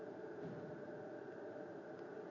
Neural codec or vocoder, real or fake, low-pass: none; real; 7.2 kHz